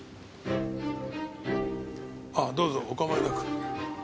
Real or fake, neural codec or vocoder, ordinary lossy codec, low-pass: real; none; none; none